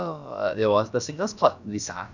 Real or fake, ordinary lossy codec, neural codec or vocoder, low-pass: fake; none; codec, 16 kHz, about 1 kbps, DyCAST, with the encoder's durations; 7.2 kHz